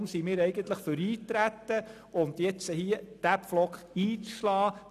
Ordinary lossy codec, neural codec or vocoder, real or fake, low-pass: none; none; real; 14.4 kHz